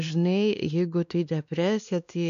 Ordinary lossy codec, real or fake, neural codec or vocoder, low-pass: MP3, 64 kbps; fake; codec, 16 kHz, 2 kbps, X-Codec, WavLM features, trained on Multilingual LibriSpeech; 7.2 kHz